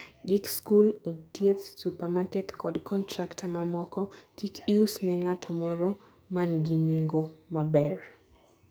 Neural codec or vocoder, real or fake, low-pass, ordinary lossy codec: codec, 44.1 kHz, 2.6 kbps, SNAC; fake; none; none